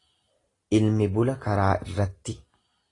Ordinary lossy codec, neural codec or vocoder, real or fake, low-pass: AAC, 32 kbps; none; real; 10.8 kHz